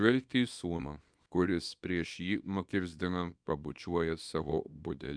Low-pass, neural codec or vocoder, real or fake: 9.9 kHz; codec, 24 kHz, 0.9 kbps, WavTokenizer, small release; fake